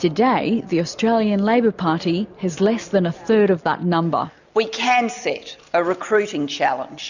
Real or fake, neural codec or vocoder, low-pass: real; none; 7.2 kHz